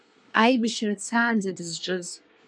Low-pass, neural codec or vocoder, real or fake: 9.9 kHz; codec, 24 kHz, 1 kbps, SNAC; fake